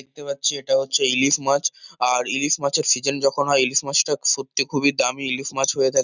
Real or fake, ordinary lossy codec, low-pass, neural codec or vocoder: real; none; 7.2 kHz; none